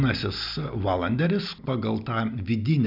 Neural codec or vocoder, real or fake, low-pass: none; real; 5.4 kHz